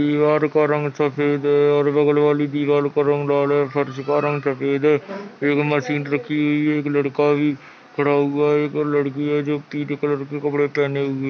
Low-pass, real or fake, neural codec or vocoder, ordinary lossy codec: none; real; none; none